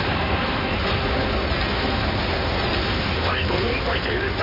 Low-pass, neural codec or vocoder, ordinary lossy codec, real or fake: 5.4 kHz; codec, 16 kHz in and 24 kHz out, 2.2 kbps, FireRedTTS-2 codec; MP3, 24 kbps; fake